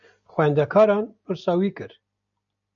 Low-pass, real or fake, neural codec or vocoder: 7.2 kHz; real; none